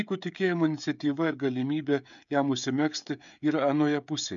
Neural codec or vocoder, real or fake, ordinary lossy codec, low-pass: codec, 16 kHz, 16 kbps, FreqCodec, smaller model; fake; MP3, 96 kbps; 7.2 kHz